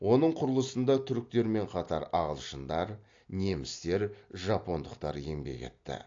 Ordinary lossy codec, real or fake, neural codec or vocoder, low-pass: AAC, 48 kbps; real; none; 7.2 kHz